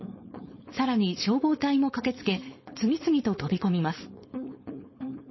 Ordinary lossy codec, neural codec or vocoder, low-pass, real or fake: MP3, 24 kbps; codec, 16 kHz, 4.8 kbps, FACodec; 7.2 kHz; fake